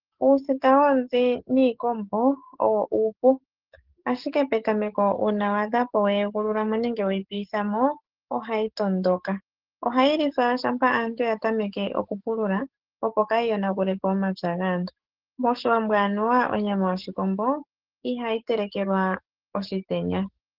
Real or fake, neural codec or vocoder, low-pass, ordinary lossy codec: fake; codec, 44.1 kHz, 7.8 kbps, DAC; 5.4 kHz; Opus, 16 kbps